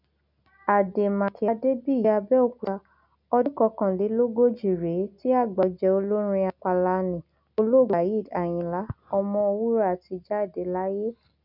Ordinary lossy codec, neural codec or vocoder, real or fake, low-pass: none; none; real; 5.4 kHz